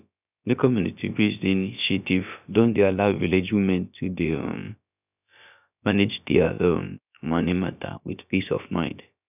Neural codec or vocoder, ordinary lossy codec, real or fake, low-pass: codec, 16 kHz, about 1 kbps, DyCAST, with the encoder's durations; none; fake; 3.6 kHz